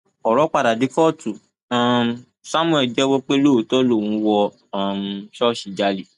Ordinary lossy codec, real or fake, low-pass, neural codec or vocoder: none; real; 10.8 kHz; none